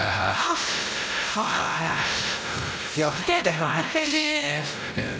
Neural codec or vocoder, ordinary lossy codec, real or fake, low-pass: codec, 16 kHz, 0.5 kbps, X-Codec, WavLM features, trained on Multilingual LibriSpeech; none; fake; none